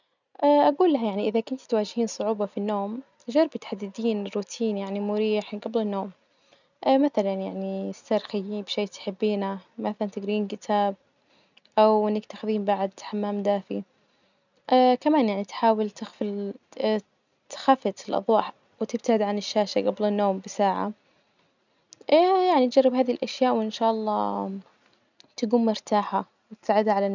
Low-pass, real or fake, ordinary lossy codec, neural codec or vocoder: 7.2 kHz; real; none; none